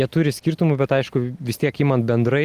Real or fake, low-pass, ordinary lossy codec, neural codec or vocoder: real; 14.4 kHz; Opus, 24 kbps; none